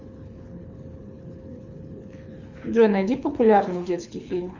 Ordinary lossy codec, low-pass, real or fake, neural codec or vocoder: Opus, 64 kbps; 7.2 kHz; fake; codec, 24 kHz, 6 kbps, HILCodec